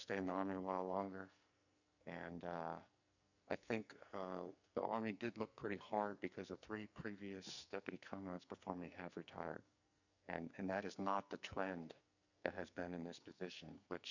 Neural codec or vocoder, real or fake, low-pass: codec, 44.1 kHz, 2.6 kbps, SNAC; fake; 7.2 kHz